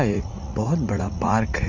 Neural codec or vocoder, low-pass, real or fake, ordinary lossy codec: vocoder, 44.1 kHz, 80 mel bands, Vocos; 7.2 kHz; fake; none